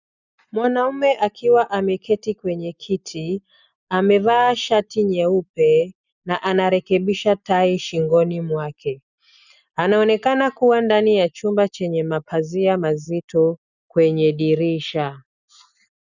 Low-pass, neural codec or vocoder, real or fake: 7.2 kHz; none; real